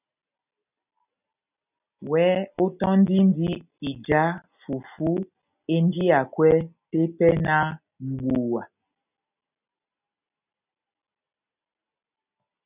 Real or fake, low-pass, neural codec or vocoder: real; 3.6 kHz; none